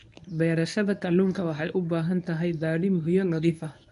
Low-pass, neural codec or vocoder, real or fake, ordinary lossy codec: 10.8 kHz; codec, 24 kHz, 0.9 kbps, WavTokenizer, medium speech release version 1; fake; none